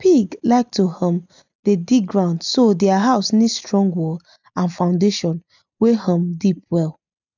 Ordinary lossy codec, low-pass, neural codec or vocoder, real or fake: none; 7.2 kHz; none; real